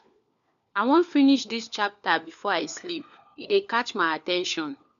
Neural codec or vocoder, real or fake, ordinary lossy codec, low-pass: codec, 16 kHz, 4 kbps, FunCodec, trained on LibriTTS, 50 frames a second; fake; none; 7.2 kHz